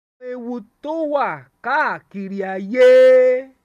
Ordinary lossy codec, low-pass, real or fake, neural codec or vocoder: none; 14.4 kHz; real; none